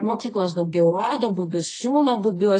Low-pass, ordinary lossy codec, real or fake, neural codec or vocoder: 10.8 kHz; AAC, 32 kbps; fake; codec, 24 kHz, 0.9 kbps, WavTokenizer, medium music audio release